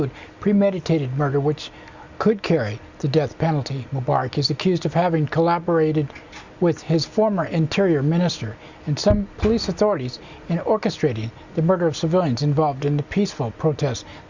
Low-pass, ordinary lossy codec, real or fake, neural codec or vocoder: 7.2 kHz; Opus, 64 kbps; real; none